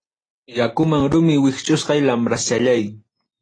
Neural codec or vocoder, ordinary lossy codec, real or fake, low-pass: none; AAC, 32 kbps; real; 9.9 kHz